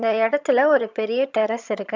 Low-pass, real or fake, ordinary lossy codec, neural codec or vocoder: 7.2 kHz; fake; MP3, 64 kbps; vocoder, 22.05 kHz, 80 mel bands, HiFi-GAN